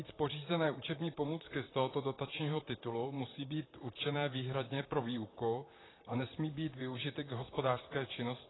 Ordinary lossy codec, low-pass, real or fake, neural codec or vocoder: AAC, 16 kbps; 7.2 kHz; fake; vocoder, 44.1 kHz, 128 mel bands, Pupu-Vocoder